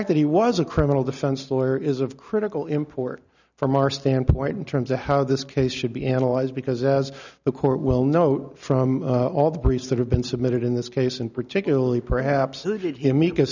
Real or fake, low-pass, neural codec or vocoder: real; 7.2 kHz; none